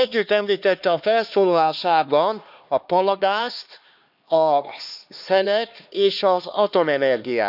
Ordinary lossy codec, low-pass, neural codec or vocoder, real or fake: none; 5.4 kHz; codec, 16 kHz, 2 kbps, X-Codec, HuBERT features, trained on LibriSpeech; fake